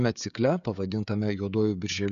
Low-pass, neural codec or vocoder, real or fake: 7.2 kHz; codec, 16 kHz, 16 kbps, FreqCodec, smaller model; fake